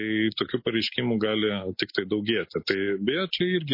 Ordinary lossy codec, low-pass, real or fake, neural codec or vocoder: MP3, 24 kbps; 5.4 kHz; real; none